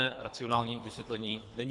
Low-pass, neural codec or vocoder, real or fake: 10.8 kHz; codec, 24 kHz, 3 kbps, HILCodec; fake